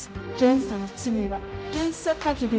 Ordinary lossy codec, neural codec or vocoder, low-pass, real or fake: none; codec, 16 kHz, 0.5 kbps, X-Codec, HuBERT features, trained on general audio; none; fake